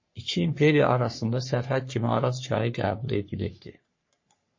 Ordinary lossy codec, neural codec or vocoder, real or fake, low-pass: MP3, 32 kbps; codec, 44.1 kHz, 3.4 kbps, Pupu-Codec; fake; 7.2 kHz